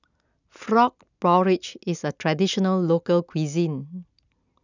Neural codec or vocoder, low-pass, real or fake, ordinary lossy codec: none; 7.2 kHz; real; none